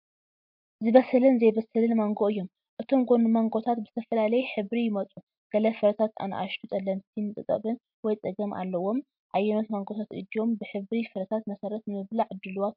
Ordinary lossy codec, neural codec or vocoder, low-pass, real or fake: MP3, 48 kbps; none; 5.4 kHz; real